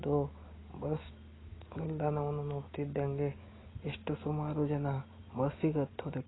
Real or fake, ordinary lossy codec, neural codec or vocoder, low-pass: real; AAC, 16 kbps; none; 7.2 kHz